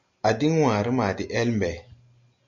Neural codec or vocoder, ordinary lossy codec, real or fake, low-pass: none; MP3, 64 kbps; real; 7.2 kHz